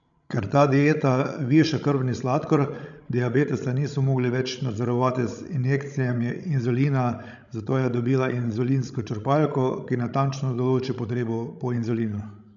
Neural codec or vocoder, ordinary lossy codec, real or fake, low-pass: codec, 16 kHz, 16 kbps, FreqCodec, larger model; none; fake; 7.2 kHz